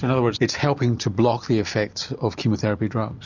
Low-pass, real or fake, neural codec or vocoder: 7.2 kHz; real; none